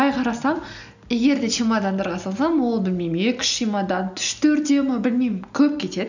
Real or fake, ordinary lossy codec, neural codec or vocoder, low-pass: real; none; none; 7.2 kHz